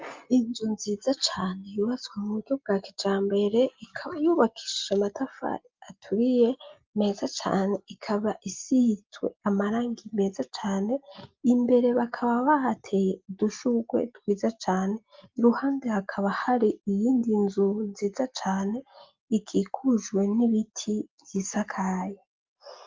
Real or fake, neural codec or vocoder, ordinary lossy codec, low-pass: real; none; Opus, 32 kbps; 7.2 kHz